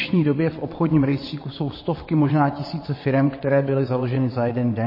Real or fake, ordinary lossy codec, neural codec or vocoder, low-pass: fake; MP3, 24 kbps; vocoder, 22.05 kHz, 80 mel bands, WaveNeXt; 5.4 kHz